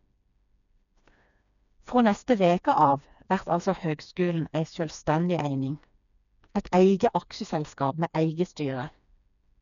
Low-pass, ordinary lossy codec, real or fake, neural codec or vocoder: 7.2 kHz; none; fake; codec, 16 kHz, 2 kbps, FreqCodec, smaller model